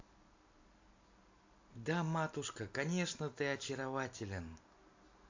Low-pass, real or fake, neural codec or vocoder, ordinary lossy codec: 7.2 kHz; real; none; AAC, 48 kbps